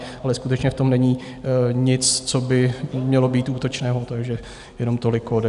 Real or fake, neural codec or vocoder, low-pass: real; none; 10.8 kHz